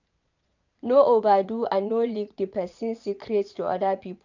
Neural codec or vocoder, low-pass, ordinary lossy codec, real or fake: vocoder, 22.05 kHz, 80 mel bands, Vocos; 7.2 kHz; none; fake